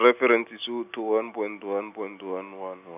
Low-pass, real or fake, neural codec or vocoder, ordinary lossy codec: 3.6 kHz; real; none; none